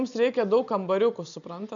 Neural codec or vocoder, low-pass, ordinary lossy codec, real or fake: none; 7.2 kHz; MP3, 96 kbps; real